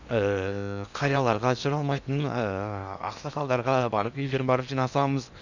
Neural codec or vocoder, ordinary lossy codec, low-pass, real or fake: codec, 16 kHz in and 24 kHz out, 0.8 kbps, FocalCodec, streaming, 65536 codes; none; 7.2 kHz; fake